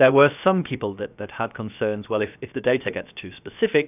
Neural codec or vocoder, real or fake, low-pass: codec, 16 kHz, 0.7 kbps, FocalCodec; fake; 3.6 kHz